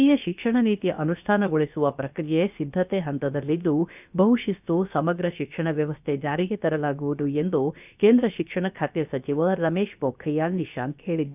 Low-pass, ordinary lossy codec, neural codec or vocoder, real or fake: 3.6 kHz; none; codec, 16 kHz, about 1 kbps, DyCAST, with the encoder's durations; fake